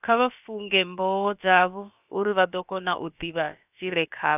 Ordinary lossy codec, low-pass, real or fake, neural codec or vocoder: none; 3.6 kHz; fake; codec, 16 kHz, about 1 kbps, DyCAST, with the encoder's durations